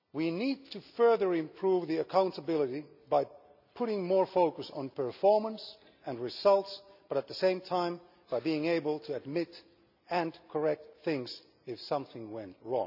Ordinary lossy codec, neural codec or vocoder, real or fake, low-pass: none; none; real; 5.4 kHz